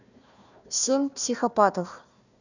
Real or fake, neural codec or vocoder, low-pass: fake; codec, 16 kHz, 1 kbps, FunCodec, trained on Chinese and English, 50 frames a second; 7.2 kHz